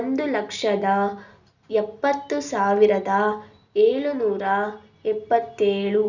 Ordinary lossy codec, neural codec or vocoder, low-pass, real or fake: none; none; 7.2 kHz; real